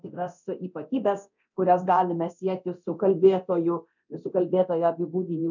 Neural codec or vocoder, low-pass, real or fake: codec, 24 kHz, 0.9 kbps, DualCodec; 7.2 kHz; fake